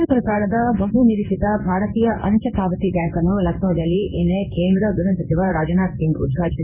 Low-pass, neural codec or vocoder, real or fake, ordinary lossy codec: 3.6 kHz; codec, 16 kHz in and 24 kHz out, 1 kbps, XY-Tokenizer; fake; none